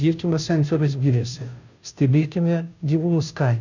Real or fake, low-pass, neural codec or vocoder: fake; 7.2 kHz; codec, 16 kHz, 0.5 kbps, FunCodec, trained on Chinese and English, 25 frames a second